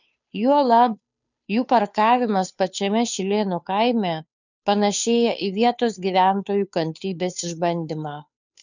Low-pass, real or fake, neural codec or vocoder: 7.2 kHz; fake; codec, 16 kHz, 2 kbps, FunCodec, trained on Chinese and English, 25 frames a second